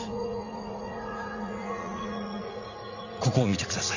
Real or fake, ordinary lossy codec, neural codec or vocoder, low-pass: fake; none; vocoder, 44.1 kHz, 80 mel bands, Vocos; 7.2 kHz